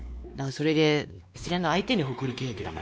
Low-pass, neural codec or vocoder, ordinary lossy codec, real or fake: none; codec, 16 kHz, 2 kbps, X-Codec, WavLM features, trained on Multilingual LibriSpeech; none; fake